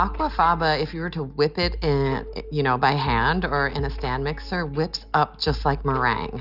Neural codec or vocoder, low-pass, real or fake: none; 5.4 kHz; real